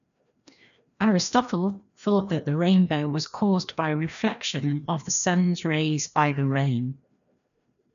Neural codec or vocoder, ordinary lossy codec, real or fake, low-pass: codec, 16 kHz, 1 kbps, FreqCodec, larger model; none; fake; 7.2 kHz